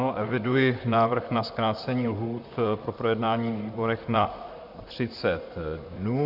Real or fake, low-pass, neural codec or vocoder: fake; 5.4 kHz; vocoder, 44.1 kHz, 128 mel bands, Pupu-Vocoder